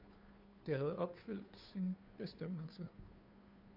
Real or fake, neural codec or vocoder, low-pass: fake; codec, 16 kHz, 2 kbps, FunCodec, trained on Chinese and English, 25 frames a second; 5.4 kHz